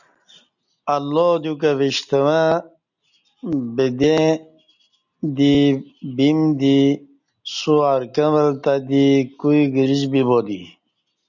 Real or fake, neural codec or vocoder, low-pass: real; none; 7.2 kHz